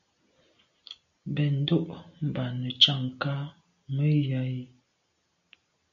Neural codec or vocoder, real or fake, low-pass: none; real; 7.2 kHz